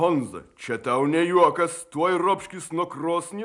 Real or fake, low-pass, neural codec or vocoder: real; 10.8 kHz; none